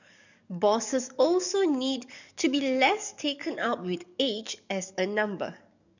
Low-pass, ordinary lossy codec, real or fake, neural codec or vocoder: 7.2 kHz; none; fake; codec, 44.1 kHz, 7.8 kbps, DAC